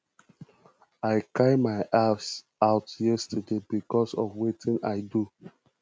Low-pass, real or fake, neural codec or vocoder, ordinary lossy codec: none; real; none; none